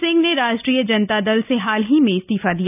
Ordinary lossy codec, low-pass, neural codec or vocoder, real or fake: none; 3.6 kHz; none; real